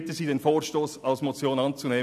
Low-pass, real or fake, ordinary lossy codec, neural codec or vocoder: 14.4 kHz; real; none; none